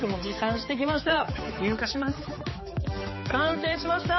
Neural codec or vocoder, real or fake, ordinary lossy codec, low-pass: codec, 16 kHz, 4 kbps, X-Codec, HuBERT features, trained on balanced general audio; fake; MP3, 24 kbps; 7.2 kHz